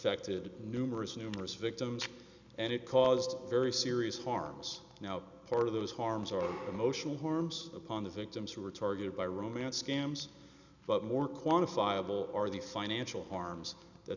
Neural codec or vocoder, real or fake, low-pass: none; real; 7.2 kHz